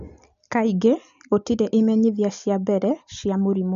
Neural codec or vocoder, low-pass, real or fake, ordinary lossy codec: none; 7.2 kHz; real; AAC, 96 kbps